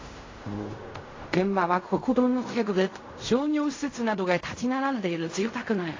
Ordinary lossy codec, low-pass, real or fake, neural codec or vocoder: AAC, 32 kbps; 7.2 kHz; fake; codec, 16 kHz in and 24 kHz out, 0.4 kbps, LongCat-Audio-Codec, fine tuned four codebook decoder